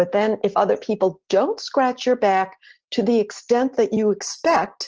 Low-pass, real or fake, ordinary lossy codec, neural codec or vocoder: 7.2 kHz; real; Opus, 16 kbps; none